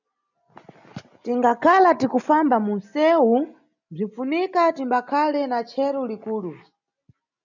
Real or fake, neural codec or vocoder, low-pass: real; none; 7.2 kHz